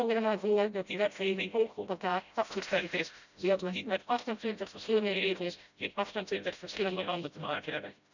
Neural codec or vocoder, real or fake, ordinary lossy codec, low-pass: codec, 16 kHz, 0.5 kbps, FreqCodec, smaller model; fake; none; 7.2 kHz